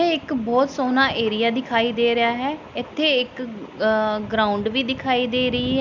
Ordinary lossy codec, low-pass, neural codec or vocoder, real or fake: none; 7.2 kHz; none; real